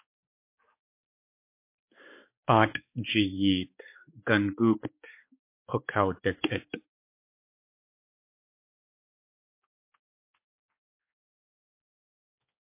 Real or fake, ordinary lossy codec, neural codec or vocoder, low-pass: fake; MP3, 24 kbps; codec, 16 kHz, 4 kbps, X-Codec, HuBERT features, trained on balanced general audio; 3.6 kHz